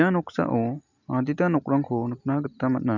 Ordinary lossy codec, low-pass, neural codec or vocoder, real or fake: none; 7.2 kHz; none; real